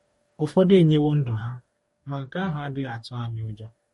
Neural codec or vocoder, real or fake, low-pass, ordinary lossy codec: codec, 44.1 kHz, 2.6 kbps, DAC; fake; 19.8 kHz; MP3, 48 kbps